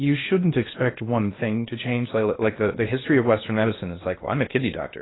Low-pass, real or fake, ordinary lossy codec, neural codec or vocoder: 7.2 kHz; fake; AAC, 16 kbps; codec, 16 kHz in and 24 kHz out, 0.8 kbps, FocalCodec, streaming, 65536 codes